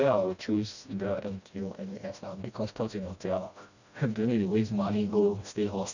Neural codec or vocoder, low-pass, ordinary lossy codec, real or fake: codec, 16 kHz, 1 kbps, FreqCodec, smaller model; 7.2 kHz; none; fake